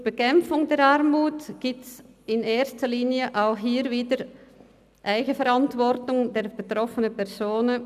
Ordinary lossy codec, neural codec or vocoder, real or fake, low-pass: none; none; real; 14.4 kHz